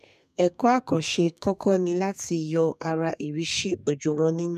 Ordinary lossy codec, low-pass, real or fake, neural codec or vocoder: none; 14.4 kHz; fake; codec, 32 kHz, 1.9 kbps, SNAC